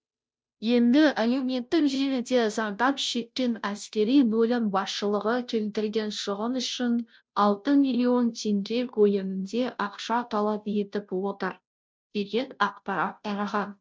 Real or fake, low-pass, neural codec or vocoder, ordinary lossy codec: fake; none; codec, 16 kHz, 0.5 kbps, FunCodec, trained on Chinese and English, 25 frames a second; none